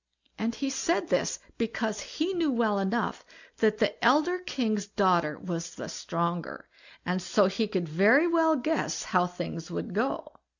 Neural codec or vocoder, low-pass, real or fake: none; 7.2 kHz; real